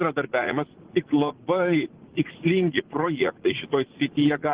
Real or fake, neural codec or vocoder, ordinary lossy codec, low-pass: fake; vocoder, 44.1 kHz, 80 mel bands, Vocos; Opus, 16 kbps; 3.6 kHz